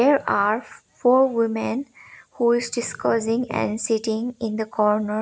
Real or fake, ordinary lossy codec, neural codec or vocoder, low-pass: real; none; none; none